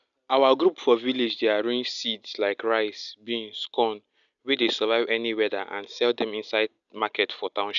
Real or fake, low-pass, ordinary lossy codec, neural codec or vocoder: real; 7.2 kHz; none; none